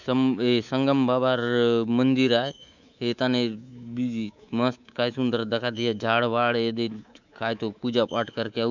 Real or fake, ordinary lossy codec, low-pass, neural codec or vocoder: fake; none; 7.2 kHz; codec, 24 kHz, 3.1 kbps, DualCodec